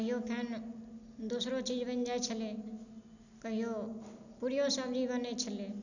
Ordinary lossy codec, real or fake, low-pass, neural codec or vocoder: none; real; 7.2 kHz; none